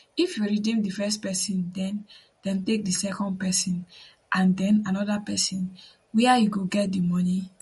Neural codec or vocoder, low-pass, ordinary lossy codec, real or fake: none; 19.8 kHz; MP3, 48 kbps; real